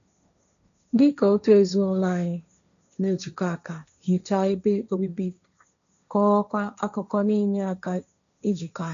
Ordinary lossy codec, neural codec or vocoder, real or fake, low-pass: none; codec, 16 kHz, 1.1 kbps, Voila-Tokenizer; fake; 7.2 kHz